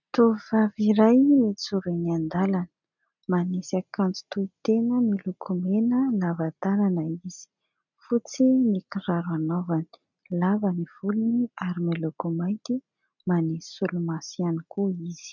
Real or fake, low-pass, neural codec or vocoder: real; 7.2 kHz; none